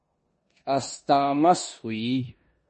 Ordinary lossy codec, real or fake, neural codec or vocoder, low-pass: MP3, 32 kbps; fake; codec, 16 kHz in and 24 kHz out, 0.9 kbps, LongCat-Audio-Codec, four codebook decoder; 10.8 kHz